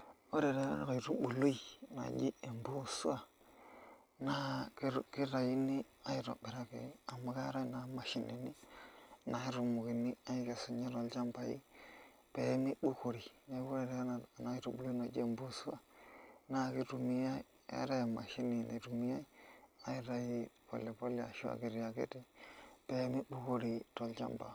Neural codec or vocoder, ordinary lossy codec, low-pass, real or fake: none; none; none; real